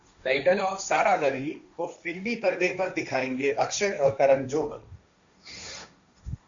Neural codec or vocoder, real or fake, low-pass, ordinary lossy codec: codec, 16 kHz, 1.1 kbps, Voila-Tokenizer; fake; 7.2 kHz; MP3, 64 kbps